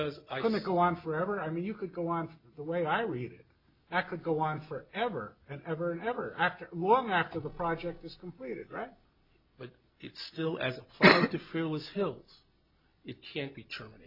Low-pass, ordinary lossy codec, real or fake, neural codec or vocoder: 5.4 kHz; MP3, 48 kbps; real; none